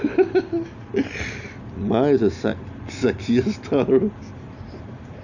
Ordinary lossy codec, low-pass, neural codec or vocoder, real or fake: none; 7.2 kHz; none; real